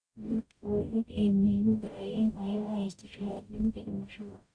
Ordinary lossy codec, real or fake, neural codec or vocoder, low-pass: Opus, 64 kbps; fake; codec, 44.1 kHz, 0.9 kbps, DAC; 9.9 kHz